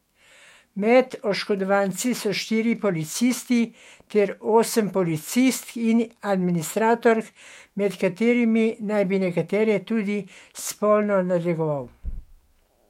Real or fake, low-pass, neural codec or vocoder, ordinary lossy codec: fake; 19.8 kHz; autoencoder, 48 kHz, 128 numbers a frame, DAC-VAE, trained on Japanese speech; MP3, 64 kbps